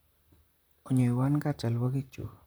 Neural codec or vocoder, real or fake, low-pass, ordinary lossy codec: vocoder, 44.1 kHz, 128 mel bands, Pupu-Vocoder; fake; none; none